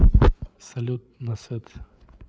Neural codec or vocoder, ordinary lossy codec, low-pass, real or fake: codec, 16 kHz, 8 kbps, FreqCodec, larger model; none; none; fake